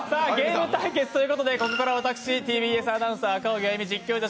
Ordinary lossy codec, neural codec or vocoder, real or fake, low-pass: none; none; real; none